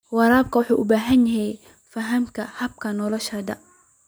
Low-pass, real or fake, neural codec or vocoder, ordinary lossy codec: none; real; none; none